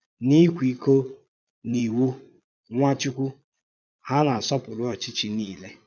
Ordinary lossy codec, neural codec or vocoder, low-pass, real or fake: none; vocoder, 22.05 kHz, 80 mel bands, Vocos; 7.2 kHz; fake